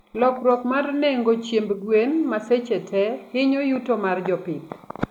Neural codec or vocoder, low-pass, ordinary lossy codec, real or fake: none; 19.8 kHz; none; real